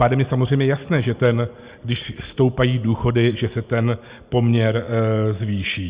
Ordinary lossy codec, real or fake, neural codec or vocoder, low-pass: Opus, 64 kbps; real; none; 3.6 kHz